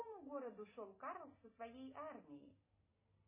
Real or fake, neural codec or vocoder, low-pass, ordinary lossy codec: real; none; 3.6 kHz; MP3, 16 kbps